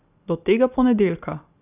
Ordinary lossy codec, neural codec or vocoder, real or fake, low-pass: none; none; real; 3.6 kHz